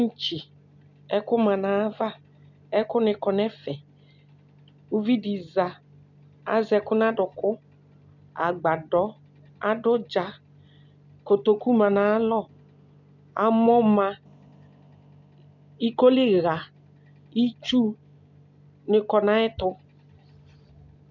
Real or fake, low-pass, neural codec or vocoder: real; 7.2 kHz; none